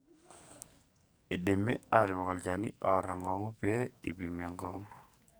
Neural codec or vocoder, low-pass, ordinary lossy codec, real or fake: codec, 44.1 kHz, 2.6 kbps, SNAC; none; none; fake